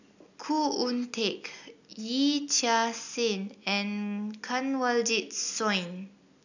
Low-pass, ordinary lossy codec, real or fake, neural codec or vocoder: 7.2 kHz; none; real; none